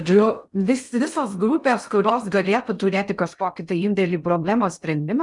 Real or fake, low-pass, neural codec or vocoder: fake; 10.8 kHz; codec, 16 kHz in and 24 kHz out, 0.6 kbps, FocalCodec, streaming, 2048 codes